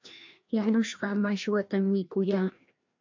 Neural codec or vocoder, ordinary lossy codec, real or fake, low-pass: codec, 16 kHz, 1 kbps, FreqCodec, larger model; MP3, 48 kbps; fake; 7.2 kHz